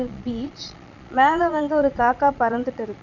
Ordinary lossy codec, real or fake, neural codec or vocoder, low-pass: none; fake; vocoder, 22.05 kHz, 80 mel bands, Vocos; 7.2 kHz